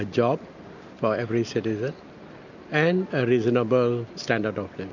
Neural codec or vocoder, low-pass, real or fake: none; 7.2 kHz; real